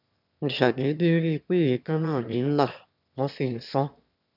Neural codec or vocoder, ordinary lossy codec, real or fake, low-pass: autoencoder, 22.05 kHz, a latent of 192 numbers a frame, VITS, trained on one speaker; none; fake; 5.4 kHz